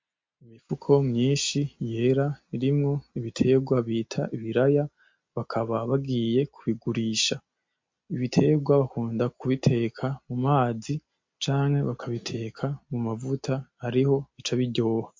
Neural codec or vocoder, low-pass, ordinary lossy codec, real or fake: none; 7.2 kHz; MP3, 48 kbps; real